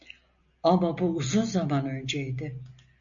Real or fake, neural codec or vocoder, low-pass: real; none; 7.2 kHz